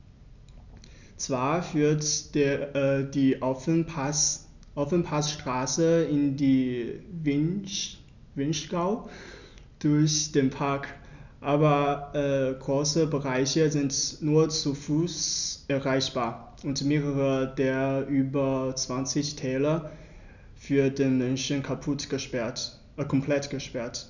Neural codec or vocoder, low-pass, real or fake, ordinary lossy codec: none; 7.2 kHz; real; none